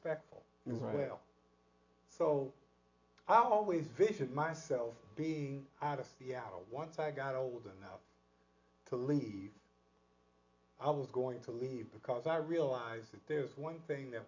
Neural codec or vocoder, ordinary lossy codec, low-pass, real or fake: none; AAC, 48 kbps; 7.2 kHz; real